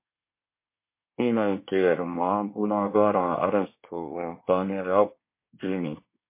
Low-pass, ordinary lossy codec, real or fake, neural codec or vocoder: 3.6 kHz; MP3, 24 kbps; fake; codec, 24 kHz, 1 kbps, SNAC